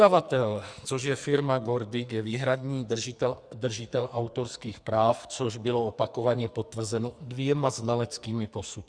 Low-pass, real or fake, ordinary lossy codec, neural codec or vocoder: 9.9 kHz; fake; Opus, 64 kbps; codec, 44.1 kHz, 2.6 kbps, SNAC